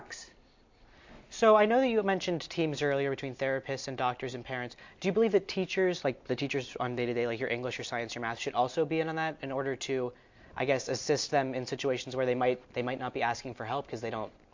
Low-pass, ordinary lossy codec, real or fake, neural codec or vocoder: 7.2 kHz; MP3, 48 kbps; real; none